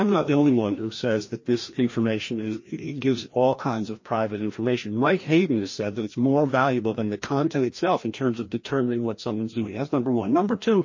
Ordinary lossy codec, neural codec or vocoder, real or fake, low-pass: MP3, 32 kbps; codec, 16 kHz, 1 kbps, FreqCodec, larger model; fake; 7.2 kHz